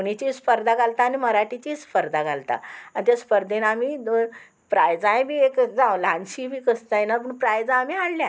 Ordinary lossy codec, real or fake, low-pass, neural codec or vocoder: none; real; none; none